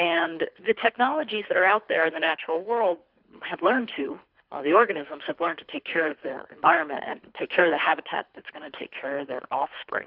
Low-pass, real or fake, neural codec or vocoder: 5.4 kHz; fake; codec, 24 kHz, 3 kbps, HILCodec